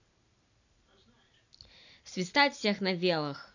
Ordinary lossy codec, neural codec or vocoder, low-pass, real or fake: none; none; 7.2 kHz; real